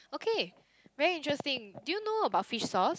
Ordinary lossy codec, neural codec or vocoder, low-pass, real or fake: none; none; none; real